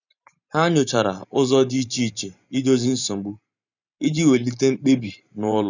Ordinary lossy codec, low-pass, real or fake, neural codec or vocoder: none; 7.2 kHz; fake; vocoder, 44.1 kHz, 128 mel bands every 512 samples, BigVGAN v2